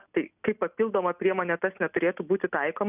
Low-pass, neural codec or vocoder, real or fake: 3.6 kHz; none; real